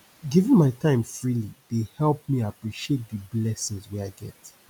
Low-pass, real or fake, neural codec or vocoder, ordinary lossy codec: 19.8 kHz; real; none; none